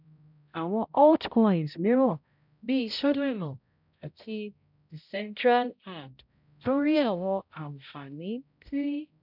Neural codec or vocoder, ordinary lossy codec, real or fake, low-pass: codec, 16 kHz, 0.5 kbps, X-Codec, HuBERT features, trained on balanced general audio; none; fake; 5.4 kHz